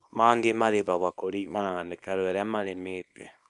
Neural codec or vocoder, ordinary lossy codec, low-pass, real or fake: codec, 24 kHz, 0.9 kbps, WavTokenizer, small release; none; 10.8 kHz; fake